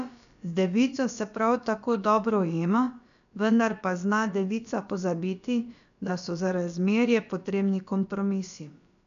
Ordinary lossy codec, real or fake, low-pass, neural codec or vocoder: none; fake; 7.2 kHz; codec, 16 kHz, about 1 kbps, DyCAST, with the encoder's durations